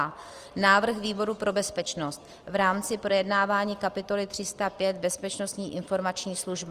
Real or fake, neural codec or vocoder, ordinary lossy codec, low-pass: real; none; Opus, 24 kbps; 14.4 kHz